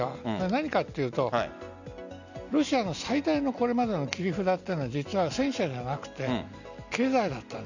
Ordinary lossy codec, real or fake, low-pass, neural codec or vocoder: none; real; 7.2 kHz; none